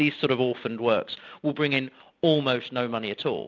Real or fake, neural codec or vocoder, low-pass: real; none; 7.2 kHz